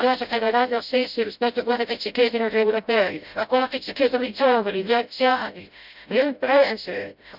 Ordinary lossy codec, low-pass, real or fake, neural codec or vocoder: none; 5.4 kHz; fake; codec, 16 kHz, 0.5 kbps, FreqCodec, smaller model